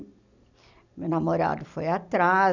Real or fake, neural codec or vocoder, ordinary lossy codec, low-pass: real; none; none; 7.2 kHz